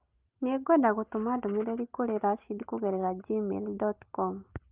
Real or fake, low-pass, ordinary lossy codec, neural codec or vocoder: real; 3.6 kHz; Opus, 24 kbps; none